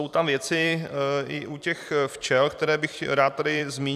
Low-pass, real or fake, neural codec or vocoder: 14.4 kHz; fake; vocoder, 44.1 kHz, 128 mel bands every 256 samples, BigVGAN v2